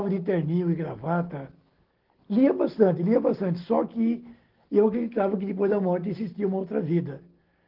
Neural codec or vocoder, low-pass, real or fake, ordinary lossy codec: none; 5.4 kHz; real; Opus, 16 kbps